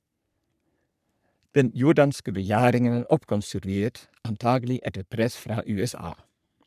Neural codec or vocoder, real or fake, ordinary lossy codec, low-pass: codec, 44.1 kHz, 3.4 kbps, Pupu-Codec; fake; none; 14.4 kHz